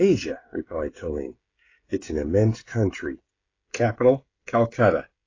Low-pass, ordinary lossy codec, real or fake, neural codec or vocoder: 7.2 kHz; AAC, 32 kbps; fake; codec, 16 kHz, 16 kbps, FreqCodec, smaller model